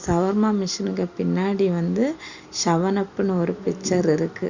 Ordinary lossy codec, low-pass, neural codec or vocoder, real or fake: Opus, 64 kbps; 7.2 kHz; none; real